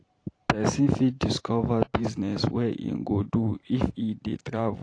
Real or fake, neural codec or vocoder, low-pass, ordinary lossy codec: fake; vocoder, 44.1 kHz, 128 mel bands every 512 samples, BigVGAN v2; 9.9 kHz; AAC, 48 kbps